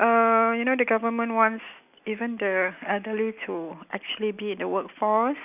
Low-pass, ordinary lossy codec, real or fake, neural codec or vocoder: 3.6 kHz; none; real; none